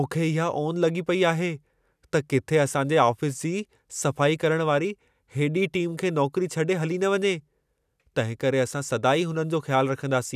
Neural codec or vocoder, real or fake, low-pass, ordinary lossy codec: none; real; 14.4 kHz; none